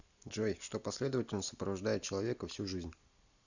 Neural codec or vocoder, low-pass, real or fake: none; 7.2 kHz; real